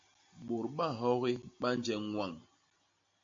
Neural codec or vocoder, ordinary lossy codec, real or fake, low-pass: none; MP3, 48 kbps; real; 7.2 kHz